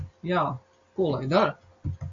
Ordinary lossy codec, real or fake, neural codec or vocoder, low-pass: AAC, 64 kbps; real; none; 7.2 kHz